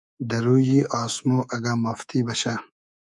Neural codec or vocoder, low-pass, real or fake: codec, 24 kHz, 3.1 kbps, DualCodec; 10.8 kHz; fake